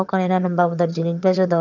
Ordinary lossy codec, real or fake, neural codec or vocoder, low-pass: none; fake; vocoder, 22.05 kHz, 80 mel bands, HiFi-GAN; 7.2 kHz